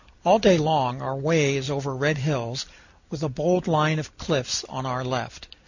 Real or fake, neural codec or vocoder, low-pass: fake; vocoder, 44.1 kHz, 128 mel bands every 256 samples, BigVGAN v2; 7.2 kHz